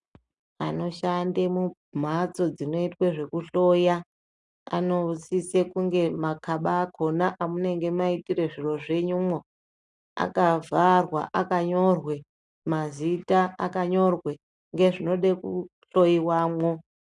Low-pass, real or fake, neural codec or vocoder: 10.8 kHz; real; none